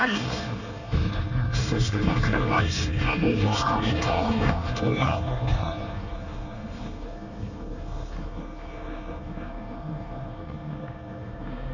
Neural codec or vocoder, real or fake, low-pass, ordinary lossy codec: codec, 24 kHz, 1 kbps, SNAC; fake; 7.2 kHz; none